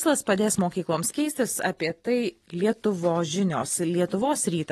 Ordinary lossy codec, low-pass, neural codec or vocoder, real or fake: AAC, 32 kbps; 14.4 kHz; none; real